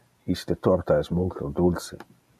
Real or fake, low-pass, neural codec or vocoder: real; 14.4 kHz; none